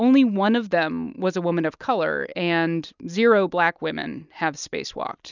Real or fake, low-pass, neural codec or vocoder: real; 7.2 kHz; none